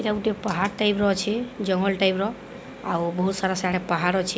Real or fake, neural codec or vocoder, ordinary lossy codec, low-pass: real; none; none; none